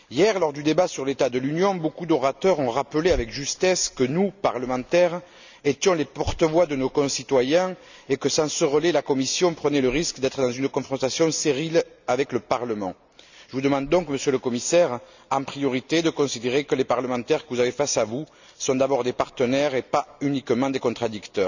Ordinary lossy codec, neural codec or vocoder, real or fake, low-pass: none; none; real; 7.2 kHz